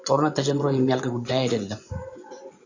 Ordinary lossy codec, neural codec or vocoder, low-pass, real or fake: AAC, 48 kbps; vocoder, 24 kHz, 100 mel bands, Vocos; 7.2 kHz; fake